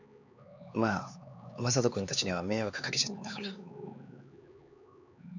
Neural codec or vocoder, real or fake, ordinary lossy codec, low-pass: codec, 16 kHz, 4 kbps, X-Codec, HuBERT features, trained on LibriSpeech; fake; none; 7.2 kHz